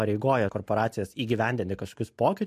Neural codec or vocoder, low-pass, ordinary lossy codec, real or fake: none; 14.4 kHz; MP3, 64 kbps; real